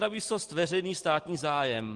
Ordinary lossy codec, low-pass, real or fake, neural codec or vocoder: Opus, 24 kbps; 10.8 kHz; real; none